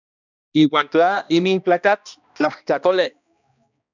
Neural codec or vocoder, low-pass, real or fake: codec, 16 kHz, 1 kbps, X-Codec, HuBERT features, trained on balanced general audio; 7.2 kHz; fake